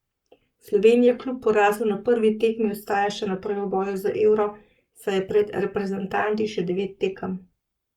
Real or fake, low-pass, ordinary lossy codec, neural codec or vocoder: fake; 19.8 kHz; Opus, 64 kbps; codec, 44.1 kHz, 7.8 kbps, Pupu-Codec